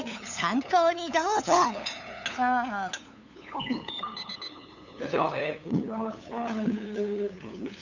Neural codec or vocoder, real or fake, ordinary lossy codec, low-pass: codec, 16 kHz, 8 kbps, FunCodec, trained on LibriTTS, 25 frames a second; fake; none; 7.2 kHz